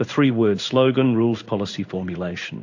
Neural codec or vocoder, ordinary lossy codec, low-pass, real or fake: codec, 16 kHz in and 24 kHz out, 1 kbps, XY-Tokenizer; AAC, 48 kbps; 7.2 kHz; fake